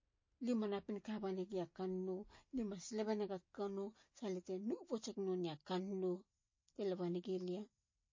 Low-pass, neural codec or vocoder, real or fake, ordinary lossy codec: 7.2 kHz; none; real; MP3, 32 kbps